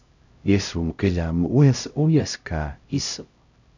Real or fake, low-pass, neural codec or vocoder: fake; 7.2 kHz; codec, 16 kHz, 0.5 kbps, X-Codec, HuBERT features, trained on LibriSpeech